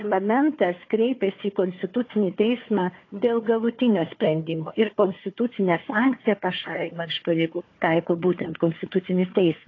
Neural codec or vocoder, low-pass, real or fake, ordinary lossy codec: codec, 16 kHz, 4 kbps, FunCodec, trained on Chinese and English, 50 frames a second; 7.2 kHz; fake; AAC, 32 kbps